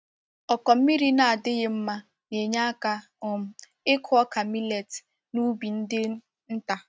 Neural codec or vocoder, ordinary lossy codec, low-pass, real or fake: none; none; none; real